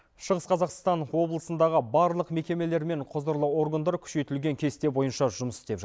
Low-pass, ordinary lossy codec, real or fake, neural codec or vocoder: none; none; real; none